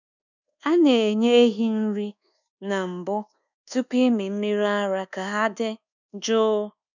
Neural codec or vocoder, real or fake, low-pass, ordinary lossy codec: codec, 24 kHz, 1.2 kbps, DualCodec; fake; 7.2 kHz; none